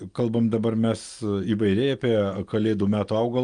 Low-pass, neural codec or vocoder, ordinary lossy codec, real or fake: 9.9 kHz; none; Opus, 32 kbps; real